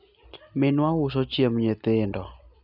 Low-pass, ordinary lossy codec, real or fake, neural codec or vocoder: 5.4 kHz; none; real; none